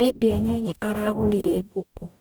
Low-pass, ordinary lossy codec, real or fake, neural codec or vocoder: none; none; fake; codec, 44.1 kHz, 0.9 kbps, DAC